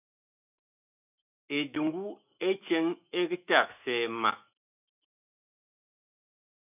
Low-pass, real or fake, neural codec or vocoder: 3.6 kHz; fake; autoencoder, 48 kHz, 128 numbers a frame, DAC-VAE, trained on Japanese speech